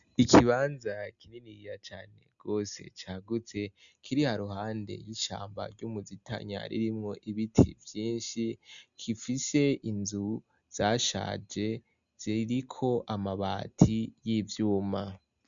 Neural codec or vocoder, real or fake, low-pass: none; real; 7.2 kHz